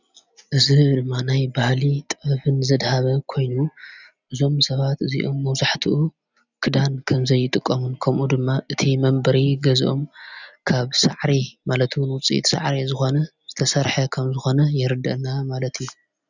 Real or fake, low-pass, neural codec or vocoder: real; 7.2 kHz; none